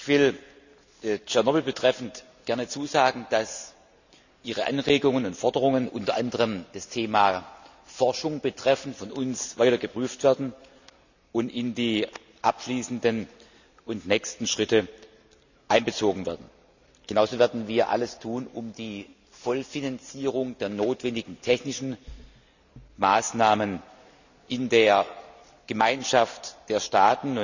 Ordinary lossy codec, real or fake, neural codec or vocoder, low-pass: none; real; none; 7.2 kHz